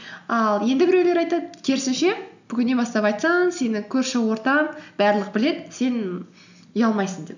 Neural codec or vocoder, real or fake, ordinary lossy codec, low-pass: none; real; none; 7.2 kHz